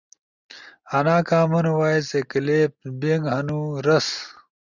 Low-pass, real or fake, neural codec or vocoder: 7.2 kHz; real; none